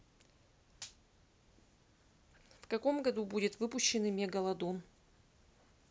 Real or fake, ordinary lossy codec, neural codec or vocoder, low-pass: real; none; none; none